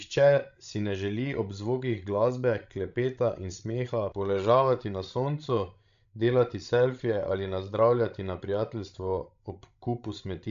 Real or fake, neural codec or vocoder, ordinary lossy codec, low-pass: fake; codec, 16 kHz, 16 kbps, FreqCodec, larger model; MP3, 64 kbps; 7.2 kHz